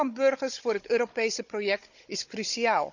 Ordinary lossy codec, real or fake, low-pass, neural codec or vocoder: none; fake; 7.2 kHz; codec, 16 kHz, 16 kbps, FunCodec, trained on Chinese and English, 50 frames a second